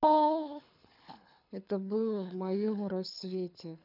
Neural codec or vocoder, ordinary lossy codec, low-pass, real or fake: codec, 24 kHz, 6 kbps, HILCodec; none; 5.4 kHz; fake